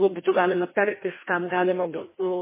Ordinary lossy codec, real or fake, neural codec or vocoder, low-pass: MP3, 16 kbps; fake; codec, 16 kHz, 1 kbps, FreqCodec, larger model; 3.6 kHz